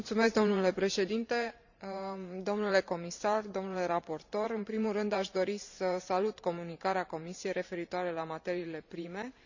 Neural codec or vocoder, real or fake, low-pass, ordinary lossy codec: vocoder, 44.1 kHz, 128 mel bands every 512 samples, BigVGAN v2; fake; 7.2 kHz; none